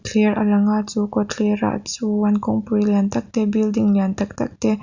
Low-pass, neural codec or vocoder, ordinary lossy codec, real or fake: 7.2 kHz; none; Opus, 64 kbps; real